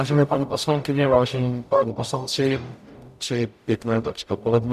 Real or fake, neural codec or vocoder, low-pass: fake; codec, 44.1 kHz, 0.9 kbps, DAC; 14.4 kHz